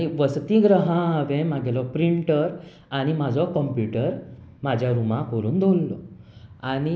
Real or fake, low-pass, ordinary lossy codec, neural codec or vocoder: real; none; none; none